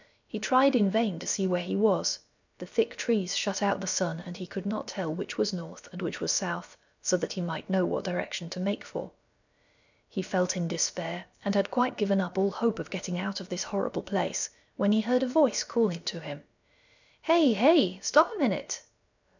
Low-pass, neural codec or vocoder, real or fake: 7.2 kHz; codec, 16 kHz, about 1 kbps, DyCAST, with the encoder's durations; fake